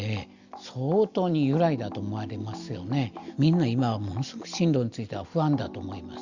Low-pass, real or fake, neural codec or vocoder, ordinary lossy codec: 7.2 kHz; real; none; Opus, 64 kbps